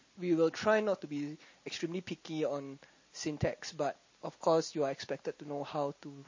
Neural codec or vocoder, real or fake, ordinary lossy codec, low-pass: none; real; MP3, 32 kbps; 7.2 kHz